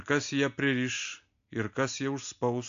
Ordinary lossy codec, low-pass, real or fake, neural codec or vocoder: AAC, 64 kbps; 7.2 kHz; real; none